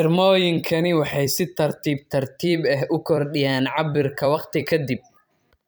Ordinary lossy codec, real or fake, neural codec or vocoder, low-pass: none; fake; vocoder, 44.1 kHz, 128 mel bands every 256 samples, BigVGAN v2; none